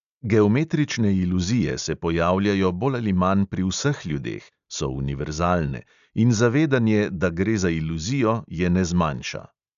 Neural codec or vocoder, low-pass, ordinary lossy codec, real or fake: none; 7.2 kHz; AAC, 96 kbps; real